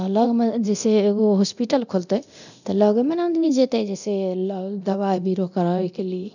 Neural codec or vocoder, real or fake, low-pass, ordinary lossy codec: codec, 24 kHz, 0.9 kbps, DualCodec; fake; 7.2 kHz; none